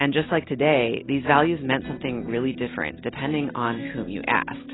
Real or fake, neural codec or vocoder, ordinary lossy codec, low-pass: real; none; AAC, 16 kbps; 7.2 kHz